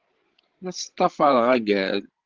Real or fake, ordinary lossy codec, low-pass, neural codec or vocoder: fake; Opus, 16 kbps; 7.2 kHz; codec, 16 kHz, 16 kbps, FreqCodec, larger model